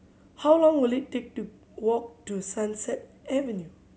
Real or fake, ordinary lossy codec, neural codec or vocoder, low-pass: real; none; none; none